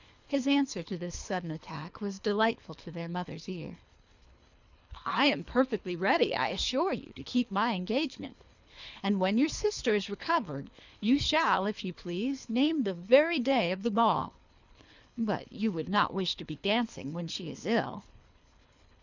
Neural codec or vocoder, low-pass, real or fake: codec, 24 kHz, 3 kbps, HILCodec; 7.2 kHz; fake